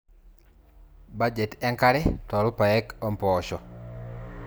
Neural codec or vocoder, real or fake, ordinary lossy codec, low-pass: none; real; none; none